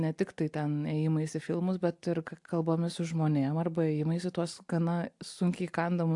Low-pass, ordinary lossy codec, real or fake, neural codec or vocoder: 10.8 kHz; AAC, 64 kbps; real; none